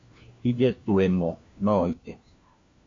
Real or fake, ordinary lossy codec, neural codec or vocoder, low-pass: fake; MP3, 48 kbps; codec, 16 kHz, 1 kbps, FunCodec, trained on LibriTTS, 50 frames a second; 7.2 kHz